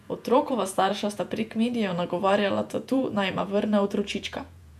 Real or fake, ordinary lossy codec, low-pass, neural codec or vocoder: fake; none; 14.4 kHz; vocoder, 48 kHz, 128 mel bands, Vocos